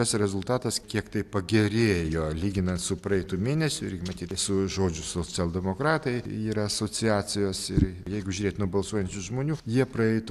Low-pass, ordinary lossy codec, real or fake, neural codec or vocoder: 14.4 kHz; AAC, 96 kbps; real; none